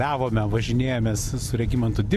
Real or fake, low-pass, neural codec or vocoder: real; 14.4 kHz; none